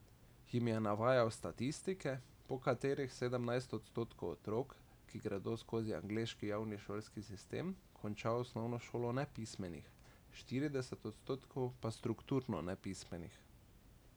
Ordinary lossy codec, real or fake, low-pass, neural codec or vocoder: none; real; none; none